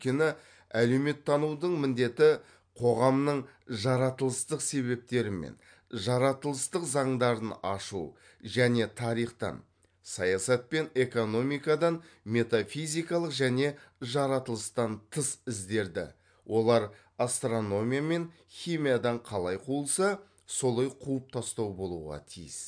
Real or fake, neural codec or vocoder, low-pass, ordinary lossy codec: real; none; 9.9 kHz; MP3, 64 kbps